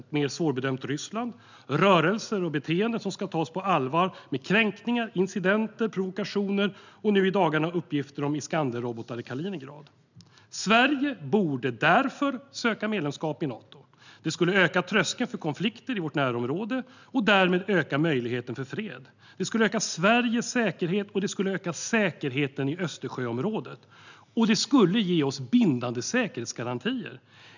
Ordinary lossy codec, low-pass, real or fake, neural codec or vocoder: none; 7.2 kHz; real; none